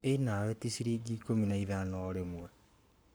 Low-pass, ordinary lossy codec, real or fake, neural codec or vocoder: none; none; fake; codec, 44.1 kHz, 7.8 kbps, Pupu-Codec